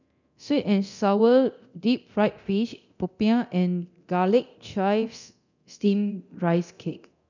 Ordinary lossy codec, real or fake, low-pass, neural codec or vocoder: none; fake; 7.2 kHz; codec, 24 kHz, 0.9 kbps, DualCodec